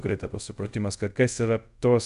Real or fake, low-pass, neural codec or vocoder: fake; 10.8 kHz; codec, 24 kHz, 0.5 kbps, DualCodec